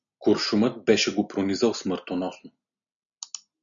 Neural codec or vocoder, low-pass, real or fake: none; 7.2 kHz; real